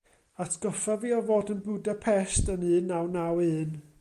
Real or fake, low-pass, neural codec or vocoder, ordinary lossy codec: real; 14.4 kHz; none; Opus, 32 kbps